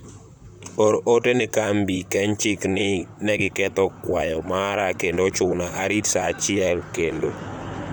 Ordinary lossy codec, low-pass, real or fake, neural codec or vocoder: none; none; fake; vocoder, 44.1 kHz, 128 mel bands, Pupu-Vocoder